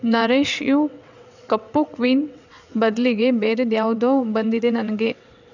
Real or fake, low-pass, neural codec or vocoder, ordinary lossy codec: fake; 7.2 kHz; vocoder, 22.05 kHz, 80 mel bands, WaveNeXt; none